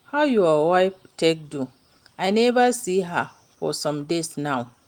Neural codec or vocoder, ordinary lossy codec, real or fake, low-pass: none; Opus, 24 kbps; real; 19.8 kHz